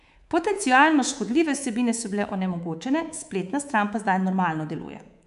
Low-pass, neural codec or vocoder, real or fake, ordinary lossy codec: 10.8 kHz; codec, 24 kHz, 3.1 kbps, DualCodec; fake; none